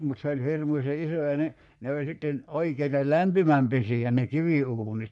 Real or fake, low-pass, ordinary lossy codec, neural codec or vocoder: fake; 10.8 kHz; none; codec, 44.1 kHz, 3.4 kbps, Pupu-Codec